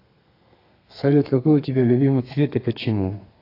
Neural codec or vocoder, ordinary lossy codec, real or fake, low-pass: codec, 32 kHz, 1.9 kbps, SNAC; AAC, 32 kbps; fake; 5.4 kHz